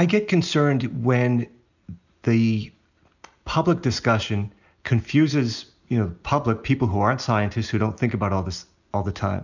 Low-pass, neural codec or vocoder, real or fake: 7.2 kHz; none; real